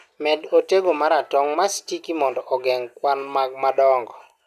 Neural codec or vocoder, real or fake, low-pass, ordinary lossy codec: none; real; 14.4 kHz; none